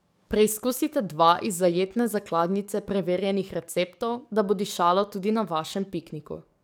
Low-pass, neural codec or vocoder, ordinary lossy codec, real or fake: none; codec, 44.1 kHz, 7.8 kbps, DAC; none; fake